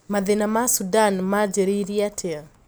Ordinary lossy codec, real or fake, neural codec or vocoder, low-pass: none; real; none; none